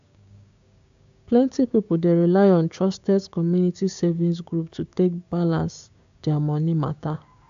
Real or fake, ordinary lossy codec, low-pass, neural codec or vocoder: fake; AAC, 64 kbps; 7.2 kHz; codec, 16 kHz, 6 kbps, DAC